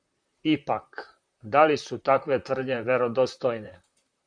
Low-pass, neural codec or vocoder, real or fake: 9.9 kHz; vocoder, 44.1 kHz, 128 mel bands, Pupu-Vocoder; fake